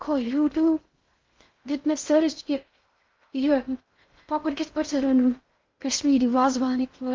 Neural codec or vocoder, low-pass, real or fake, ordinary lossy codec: codec, 16 kHz in and 24 kHz out, 0.6 kbps, FocalCodec, streaming, 2048 codes; 7.2 kHz; fake; Opus, 32 kbps